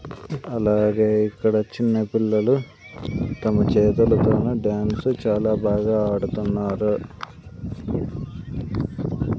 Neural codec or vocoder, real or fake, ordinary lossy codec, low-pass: none; real; none; none